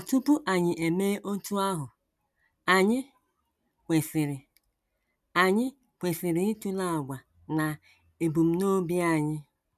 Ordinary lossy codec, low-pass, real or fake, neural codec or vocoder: none; 14.4 kHz; real; none